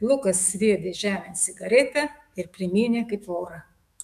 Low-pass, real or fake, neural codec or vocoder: 14.4 kHz; fake; autoencoder, 48 kHz, 128 numbers a frame, DAC-VAE, trained on Japanese speech